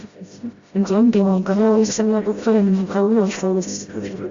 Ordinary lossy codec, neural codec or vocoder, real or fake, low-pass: Opus, 64 kbps; codec, 16 kHz, 0.5 kbps, FreqCodec, smaller model; fake; 7.2 kHz